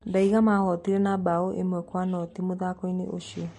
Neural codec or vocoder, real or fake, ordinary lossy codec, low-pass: none; real; MP3, 48 kbps; 14.4 kHz